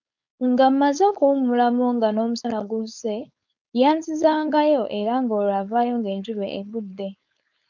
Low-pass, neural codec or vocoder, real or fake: 7.2 kHz; codec, 16 kHz, 4.8 kbps, FACodec; fake